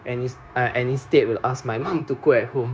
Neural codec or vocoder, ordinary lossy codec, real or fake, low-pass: codec, 16 kHz, 0.9 kbps, LongCat-Audio-Codec; none; fake; none